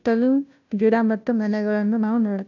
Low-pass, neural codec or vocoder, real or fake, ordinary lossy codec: 7.2 kHz; codec, 16 kHz, 0.5 kbps, FunCodec, trained on Chinese and English, 25 frames a second; fake; none